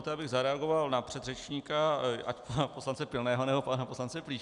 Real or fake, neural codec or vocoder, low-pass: real; none; 10.8 kHz